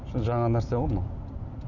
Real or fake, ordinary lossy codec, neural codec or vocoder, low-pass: real; none; none; 7.2 kHz